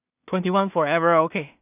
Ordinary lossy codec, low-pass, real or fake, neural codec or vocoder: none; 3.6 kHz; fake; codec, 16 kHz in and 24 kHz out, 0.4 kbps, LongCat-Audio-Codec, two codebook decoder